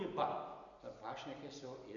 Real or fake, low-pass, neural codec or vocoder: real; 7.2 kHz; none